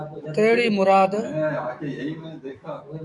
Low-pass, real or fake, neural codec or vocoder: 10.8 kHz; fake; autoencoder, 48 kHz, 128 numbers a frame, DAC-VAE, trained on Japanese speech